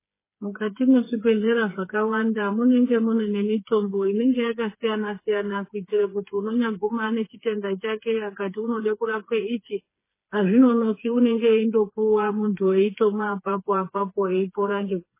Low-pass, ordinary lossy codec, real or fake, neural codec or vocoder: 3.6 kHz; MP3, 16 kbps; fake; codec, 16 kHz, 4 kbps, FreqCodec, smaller model